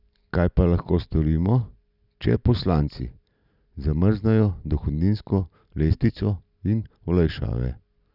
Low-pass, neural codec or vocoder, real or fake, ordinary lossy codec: 5.4 kHz; none; real; none